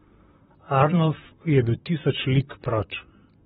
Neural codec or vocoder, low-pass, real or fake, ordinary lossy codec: vocoder, 44.1 kHz, 128 mel bands, Pupu-Vocoder; 19.8 kHz; fake; AAC, 16 kbps